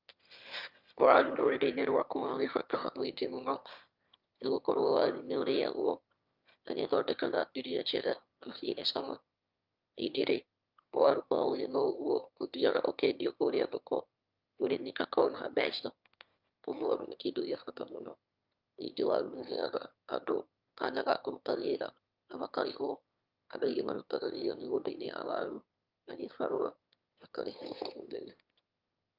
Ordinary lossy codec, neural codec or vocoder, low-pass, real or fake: Opus, 24 kbps; autoencoder, 22.05 kHz, a latent of 192 numbers a frame, VITS, trained on one speaker; 5.4 kHz; fake